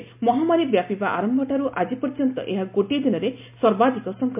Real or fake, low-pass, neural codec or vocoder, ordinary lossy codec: real; 3.6 kHz; none; MP3, 32 kbps